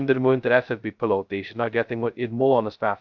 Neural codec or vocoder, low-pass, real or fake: codec, 16 kHz, 0.2 kbps, FocalCodec; 7.2 kHz; fake